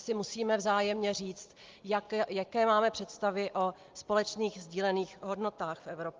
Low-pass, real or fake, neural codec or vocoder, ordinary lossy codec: 7.2 kHz; real; none; Opus, 32 kbps